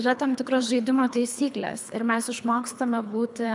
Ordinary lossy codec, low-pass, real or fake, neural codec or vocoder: MP3, 96 kbps; 10.8 kHz; fake; codec, 24 kHz, 3 kbps, HILCodec